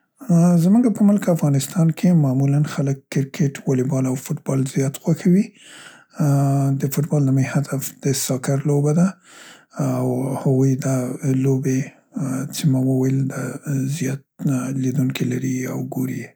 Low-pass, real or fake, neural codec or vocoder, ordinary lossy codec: none; real; none; none